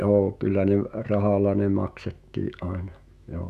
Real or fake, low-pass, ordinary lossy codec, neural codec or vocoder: fake; 14.4 kHz; none; autoencoder, 48 kHz, 128 numbers a frame, DAC-VAE, trained on Japanese speech